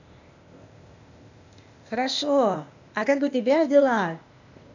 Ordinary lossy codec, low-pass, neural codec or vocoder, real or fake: none; 7.2 kHz; codec, 16 kHz, 0.8 kbps, ZipCodec; fake